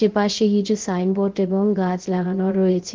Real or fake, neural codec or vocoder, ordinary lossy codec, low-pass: fake; codec, 16 kHz, 0.3 kbps, FocalCodec; Opus, 16 kbps; 7.2 kHz